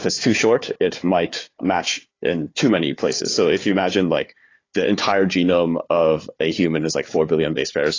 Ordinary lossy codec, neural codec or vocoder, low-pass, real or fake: AAC, 32 kbps; codec, 16 kHz, 4 kbps, FunCodec, trained on Chinese and English, 50 frames a second; 7.2 kHz; fake